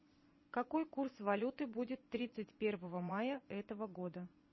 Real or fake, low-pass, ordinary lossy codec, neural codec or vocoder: fake; 7.2 kHz; MP3, 24 kbps; vocoder, 22.05 kHz, 80 mel bands, Vocos